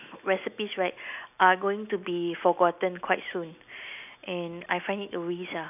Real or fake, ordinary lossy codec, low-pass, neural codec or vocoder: real; none; 3.6 kHz; none